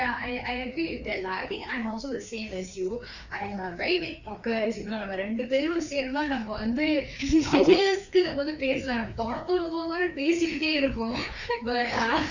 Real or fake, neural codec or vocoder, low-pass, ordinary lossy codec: fake; codec, 16 kHz, 2 kbps, FreqCodec, larger model; 7.2 kHz; none